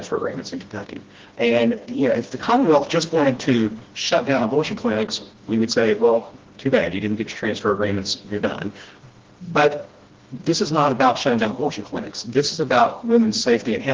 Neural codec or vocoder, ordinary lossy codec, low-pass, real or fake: codec, 16 kHz, 1 kbps, FreqCodec, smaller model; Opus, 16 kbps; 7.2 kHz; fake